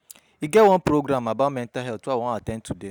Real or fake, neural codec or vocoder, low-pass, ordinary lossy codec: real; none; none; none